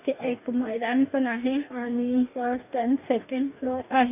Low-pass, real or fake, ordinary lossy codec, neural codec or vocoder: 3.6 kHz; fake; none; codec, 44.1 kHz, 2.6 kbps, DAC